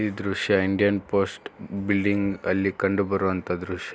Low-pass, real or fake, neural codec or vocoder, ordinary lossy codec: none; real; none; none